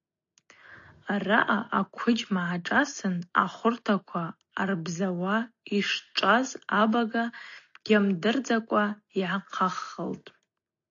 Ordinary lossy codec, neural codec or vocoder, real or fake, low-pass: AAC, 48 kbps; none; real; 7.2 kHz